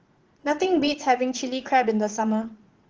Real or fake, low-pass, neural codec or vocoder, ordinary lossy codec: fake; 7.2 kHz; vocoder, 44.1 kHz, 80 mel bands, Vocos; Opus, 16 kbps